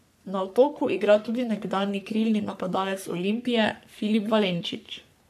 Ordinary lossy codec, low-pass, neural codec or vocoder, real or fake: AAC, 96 kbps; 14.4 kHz; codec, 44.1 kHz, 3.4 kbps, Pupu-Codec; fake